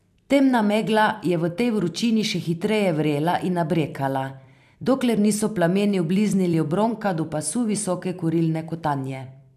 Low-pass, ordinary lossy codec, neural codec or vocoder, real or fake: 14.4 kHz; none; vocoder, 44.1 kHz, 128 mel bands every 256 samples, BigVGAN v2; fake